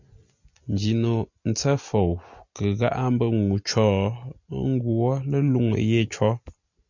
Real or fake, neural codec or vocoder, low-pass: real; none; 7.2 kHz